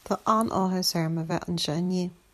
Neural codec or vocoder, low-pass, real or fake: vocoder, 44.1 kHz, 128 mel bands every 256 samples, BigVGAN v2; 14.4 kHz; fake